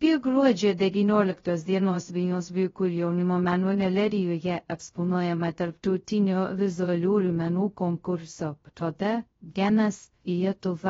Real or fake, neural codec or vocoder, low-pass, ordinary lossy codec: fake; codec, 16 kHz, 0.2 kbps, FocalCodec; 7.2 kHz; AAC, 24 kbps